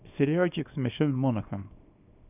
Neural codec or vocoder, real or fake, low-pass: codec, 24 kHz, 0.9 kbps, WavTokenizer, small release; fake; 3.6 kHz